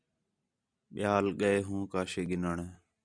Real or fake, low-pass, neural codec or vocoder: real; 9.9 kHz; none